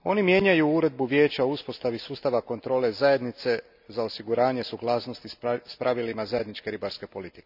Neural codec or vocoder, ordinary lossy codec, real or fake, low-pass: none; none; real; 5.4 kHz